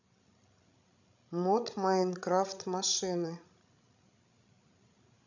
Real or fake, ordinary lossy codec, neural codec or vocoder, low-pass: fake; none; codec, 16 kHz, 16 kbps, FreqCodec, larger model; 7.2 kHz